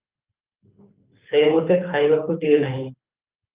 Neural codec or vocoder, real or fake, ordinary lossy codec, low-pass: codec, 24 kHz, 6 kbps, HILCodec; fake; Opus, 24 kbps; 3.6 kHz